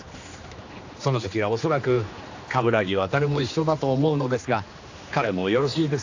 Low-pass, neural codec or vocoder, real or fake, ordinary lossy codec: 7.2 kHz; codec, 16 kHz, 2 kbps, X-Codec, HuBERT features, trained on general audio; fake; AAC, 48 kbps